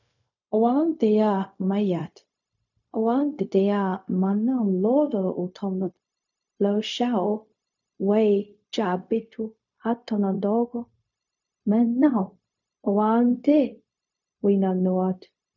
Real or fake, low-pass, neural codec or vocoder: fake; 7.2 kHz; codec, 16 kHz, 0.4 kbps, LongCat-Audio-Codec